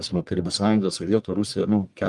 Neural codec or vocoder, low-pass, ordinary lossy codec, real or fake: codec, 44.1 kHz, 1.7 kbps, Pupu-Codec; 10.8 kHz; Opus, 32 kbps; fake